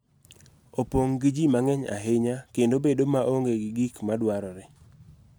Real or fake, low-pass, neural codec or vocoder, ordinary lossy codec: real; none; none; none